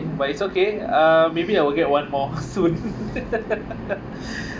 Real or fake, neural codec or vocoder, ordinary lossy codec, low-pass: real; none; none; none